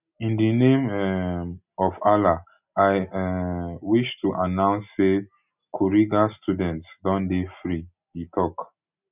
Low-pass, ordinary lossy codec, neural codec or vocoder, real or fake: 3.6 kHz; none; none; real